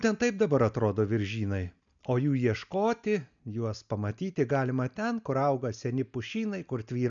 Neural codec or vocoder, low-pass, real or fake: none; 7.2 kHz; real